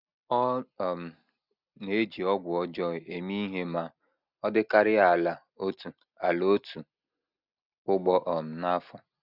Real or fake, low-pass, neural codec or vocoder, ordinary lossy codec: real; 5.4 kHz; none; none